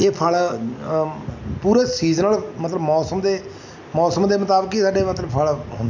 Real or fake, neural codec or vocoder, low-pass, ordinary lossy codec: real; none; 7.2 kHz; none